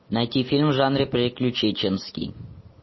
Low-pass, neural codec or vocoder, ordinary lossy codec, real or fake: 7.2 kHz; none; MP3, 24 kbps; real